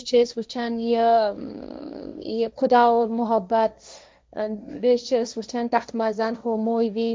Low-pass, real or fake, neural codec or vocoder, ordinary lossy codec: none; fake; codec, 16 kHz, 1.1 kbps, Voila-Tokenizer; none